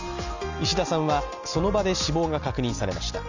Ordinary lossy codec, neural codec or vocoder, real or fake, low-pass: none; none; real; 7.2 kHz